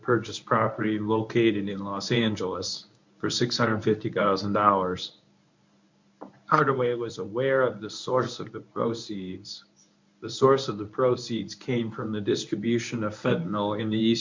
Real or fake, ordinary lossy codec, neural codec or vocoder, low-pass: fake; MP3, 64 kbps; codec, 24 kHz, 0.9 kbps, WavTokenizer, medium speech release version 1; 7.2 kHz